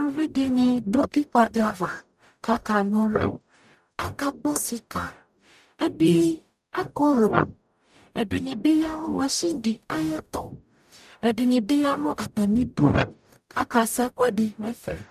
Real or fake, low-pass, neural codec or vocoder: fake; 14.4 kHz; codec, 44.1 kHz, 0.9 kbps, DAC